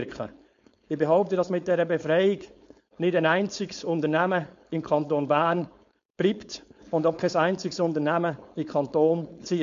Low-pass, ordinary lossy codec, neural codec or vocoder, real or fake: 7.2 kHz; MP3, 48 kbps; codec, 16 kHz, 4.8 kbps, FACodec; fake